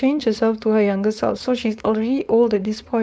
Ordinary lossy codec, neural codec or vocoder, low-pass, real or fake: none; codec, 16 kHz, 4.8 kbps, FACodec; none; fake